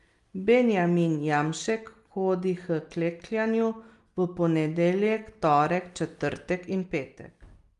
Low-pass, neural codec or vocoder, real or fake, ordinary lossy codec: 10.8 kHz; none; real; Opus, 32 kbps